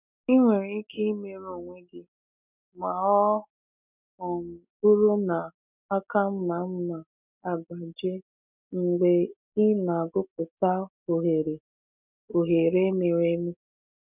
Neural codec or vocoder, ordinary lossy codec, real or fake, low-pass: none; none; real; 3.6 kHz